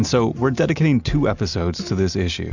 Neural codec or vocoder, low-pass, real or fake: none; 7.2 kHz; real